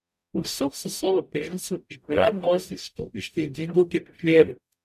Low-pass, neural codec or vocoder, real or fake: 14.4 kHz; codec, 44.1 kHz, 0.9 kbps, DAC; fake